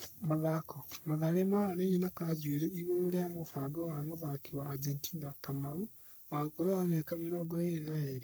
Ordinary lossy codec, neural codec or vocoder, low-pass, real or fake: none; codec, 44.1 kHz, 3.4 kbps, Pupu-Codec; none; fake